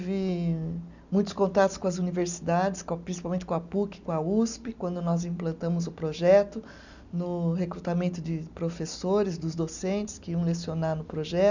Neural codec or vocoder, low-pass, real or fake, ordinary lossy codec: none; 7.2 kHz; real; none